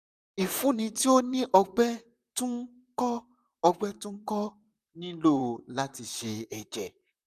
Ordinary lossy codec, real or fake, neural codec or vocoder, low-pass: none; fake; vocoder, 44.1 kHz, 128 mel bands, Pupu-Vocoder; 14.4 kHz